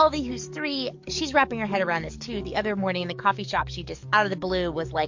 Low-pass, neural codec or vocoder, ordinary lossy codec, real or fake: 7.2 kHz; codec, 44.1 kHz, 7.8 kbps, DAC; MP3, 48 kbps; fake